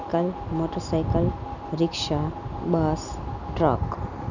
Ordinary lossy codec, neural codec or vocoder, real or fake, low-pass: none; none; real; 7.2 kHz